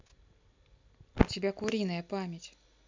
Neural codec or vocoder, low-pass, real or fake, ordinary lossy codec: none; 7.2 kHz; real; MP3, 64 kbps